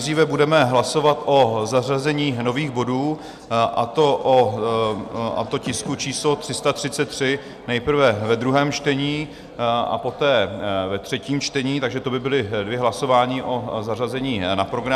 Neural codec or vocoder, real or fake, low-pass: none; real; 14.4 kHz